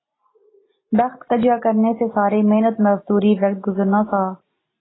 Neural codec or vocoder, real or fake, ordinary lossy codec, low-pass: none; real; AAC, 16 kbps; 7.2 kHz